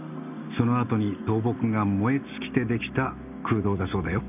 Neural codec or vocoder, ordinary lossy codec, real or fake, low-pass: none; none; real; 3.6 kHz